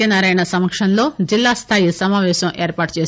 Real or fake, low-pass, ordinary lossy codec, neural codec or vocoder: real; none; none; none